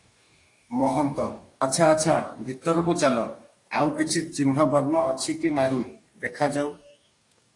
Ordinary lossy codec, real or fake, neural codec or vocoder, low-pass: AAC, 48 kbps; fake; codec, 44.1 kHz, 2.6 kbps, DAC; 10.8 kHz